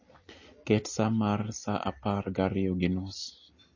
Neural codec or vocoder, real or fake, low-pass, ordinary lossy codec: none; real; 7.2 kHz; MP3, 32 kbps